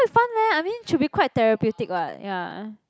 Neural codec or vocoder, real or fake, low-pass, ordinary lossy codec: none; real; none; none